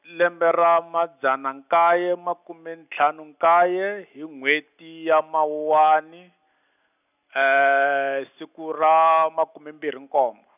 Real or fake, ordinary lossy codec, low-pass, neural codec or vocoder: real; none; 3.6 kHz; none